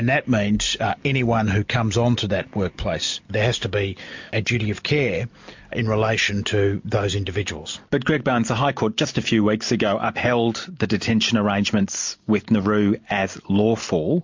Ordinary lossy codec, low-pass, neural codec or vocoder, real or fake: MP3, 48 kbps; 7.2 kHz; none; real